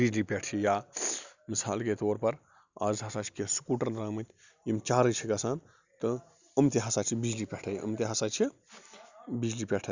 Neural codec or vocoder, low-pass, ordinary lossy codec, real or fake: none; 7.2 kHz; Opus, 64 kbps; real